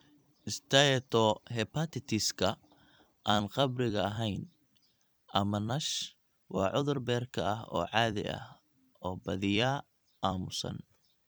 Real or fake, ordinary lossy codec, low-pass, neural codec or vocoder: fake; none; none; vocoder, 44.1 kHz, 128 mel bands every 256 samples, BigVGAN v2